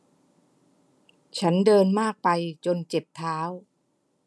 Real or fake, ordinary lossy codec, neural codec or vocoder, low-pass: real; none; none; none